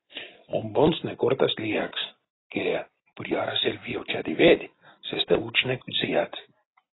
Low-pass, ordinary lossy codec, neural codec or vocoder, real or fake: 7.2 kHz; AAC, 16 kbps; none; real